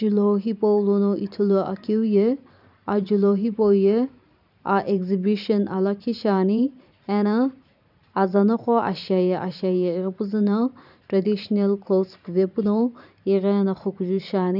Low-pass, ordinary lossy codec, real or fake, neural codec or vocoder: 5.4 kHz; none; fake; vocoder, 44.1 kHz, 128 mel bands every 512 samples, BigVGAN v2